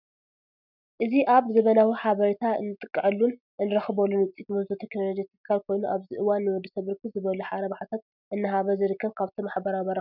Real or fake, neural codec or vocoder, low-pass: real; none; 5.4 kHz